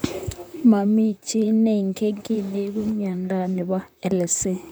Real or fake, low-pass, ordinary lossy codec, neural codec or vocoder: fake; none; none; vocoder, 44.1 kHz, 128 mel bands, Pupu-Vocoder